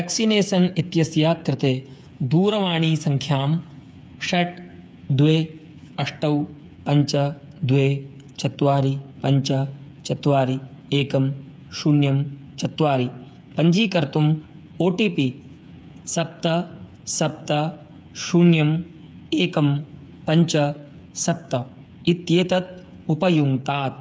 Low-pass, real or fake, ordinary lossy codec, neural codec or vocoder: none; fake; none; codec, 16 kHz, 8 kbps, FreqCodec, smaller model